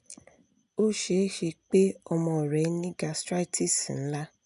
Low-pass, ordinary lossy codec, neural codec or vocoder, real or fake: 10.8 kHz; none; none; real